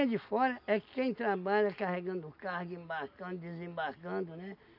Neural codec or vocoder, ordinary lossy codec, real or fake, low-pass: none; none; real; 5.4 kHz